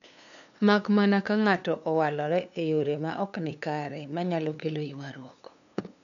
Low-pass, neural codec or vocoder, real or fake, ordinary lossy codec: 7.2 kHz; codec, 16 kHz, 2 kbps, FunCodec, trained on LibriTTS, 25 frames a second; fake; none